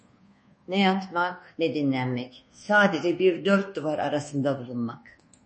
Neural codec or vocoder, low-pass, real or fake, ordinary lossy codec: codec, 24 kHz, 1.2 kbps, DualCodec; 10.8 kHz; fake; MP3, 32 kbps